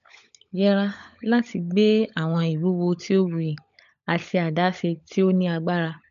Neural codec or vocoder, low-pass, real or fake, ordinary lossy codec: codec, 16 kHz, 16 kbps, FunCodec, trained on LibriTTS, 50 frames a second; 7.2 kHz; fake; none